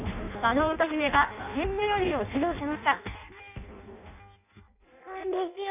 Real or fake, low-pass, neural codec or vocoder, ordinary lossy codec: fake; 3.6 kHz; codec, 16 kHz in and 24 kHz out, 0.6 kbps, FireRedTTS-2 codec; none